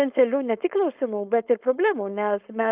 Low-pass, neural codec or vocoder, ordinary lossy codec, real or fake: 3.6 kHz; codec, 16 kHz, 4.8 kbps, FACodec; Opus, 32 kbps; fake